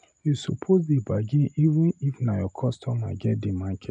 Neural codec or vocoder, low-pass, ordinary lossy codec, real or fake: none; 9.9 kHz; none; real